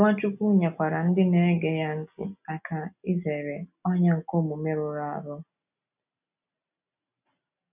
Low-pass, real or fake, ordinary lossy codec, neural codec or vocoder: 3.6 kHz; real; none; none